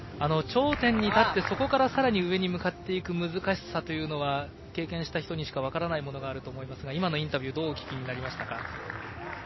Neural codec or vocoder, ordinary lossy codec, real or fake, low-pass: none; MP3, 24 kbps; real; 7.2 kHz